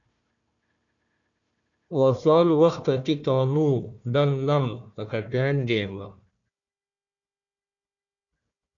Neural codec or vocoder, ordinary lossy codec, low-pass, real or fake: codec, 16 kHz, 1 kbps, FunCodec, trained on Chinese and English, 50 frames a second; MP3, 96 kbps; 7.2 kHz; fake